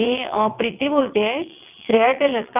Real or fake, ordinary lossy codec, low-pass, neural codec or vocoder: fake; none; 3.6 kHz; vocoder, 22.05 kHz, 80 mel bands, WaveNeXt